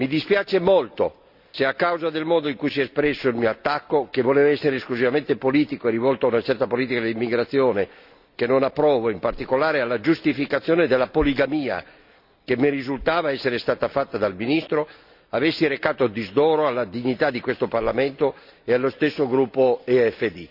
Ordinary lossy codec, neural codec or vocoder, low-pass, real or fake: none; none; 5.4 kHz; real